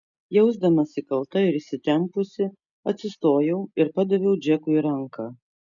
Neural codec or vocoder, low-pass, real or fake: none; 7.2 kHz; real